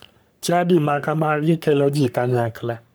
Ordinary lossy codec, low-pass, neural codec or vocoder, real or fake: none; none; codec, 44.1 kHz, 3.4 kbps, Pupu-Codec; fake